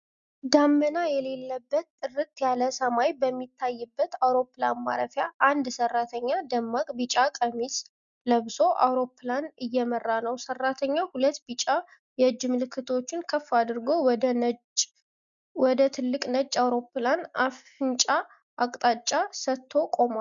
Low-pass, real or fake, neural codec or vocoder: 7.2 kHz; real; none